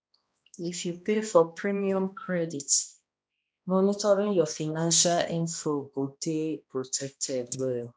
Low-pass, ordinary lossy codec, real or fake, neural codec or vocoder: none; none; fake; codec, 16 kHz, 1 kbps, X-Codec, HuBERT features, trained on balanced general audio